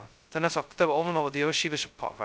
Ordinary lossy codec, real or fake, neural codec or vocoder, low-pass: none; fake; codec, 16 kHz, 0.2 kbps, FocalCodec; none